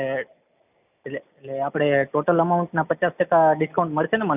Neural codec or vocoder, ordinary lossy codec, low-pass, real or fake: none; none; 3.6 kHz; real